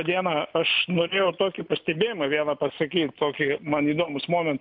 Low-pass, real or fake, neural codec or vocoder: 5.4 kHz; real; none